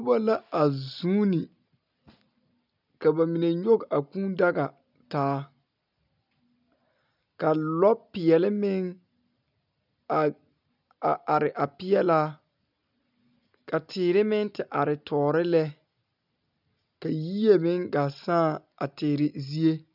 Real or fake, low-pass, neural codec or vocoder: real; 5.4 kHz; none